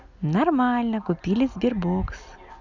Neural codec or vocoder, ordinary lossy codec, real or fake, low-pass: none; none; real; 7.2 kHz